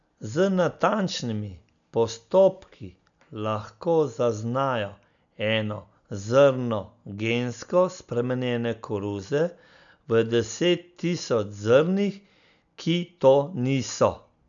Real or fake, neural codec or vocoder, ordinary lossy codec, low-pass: real; none; none; 7.2 kHz